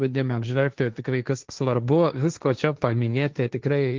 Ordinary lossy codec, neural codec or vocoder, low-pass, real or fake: Opus, 32 kbps; codec, 16 kHz, 1.1 kbps, Voila-Tokenizer; 7.2 kHz; fake